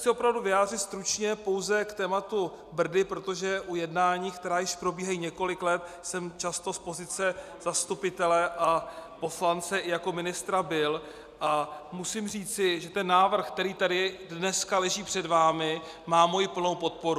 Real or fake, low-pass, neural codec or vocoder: real; 14.4 kHz; none